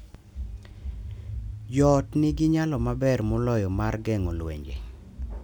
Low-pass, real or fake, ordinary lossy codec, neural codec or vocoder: 19.8 kHz; real; none; none